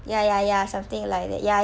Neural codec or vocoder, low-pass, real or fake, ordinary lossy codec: none; none; real; none